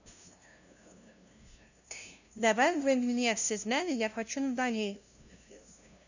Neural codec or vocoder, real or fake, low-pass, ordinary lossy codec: codec, 16 kHz, 0.5 kbps, FunCodec, trained on LibriTTS, 25 frames a second; fake; 7.2 kHz; none